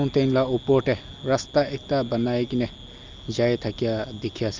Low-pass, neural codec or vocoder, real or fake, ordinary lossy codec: 7.2 kHz; none; real; Opus, 24 kbps